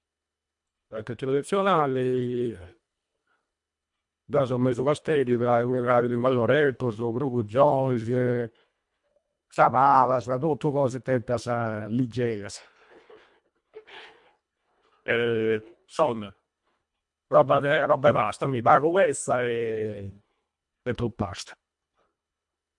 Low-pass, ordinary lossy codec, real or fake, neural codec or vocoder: 10.8 kHz; MP3, 64 kbps; fake; codec, 24 kHz, 1.5 kbps, HILCodec